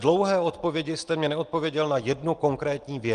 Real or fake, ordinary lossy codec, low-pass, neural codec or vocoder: real; Opus, 24 kbps; 9.9 kHz; none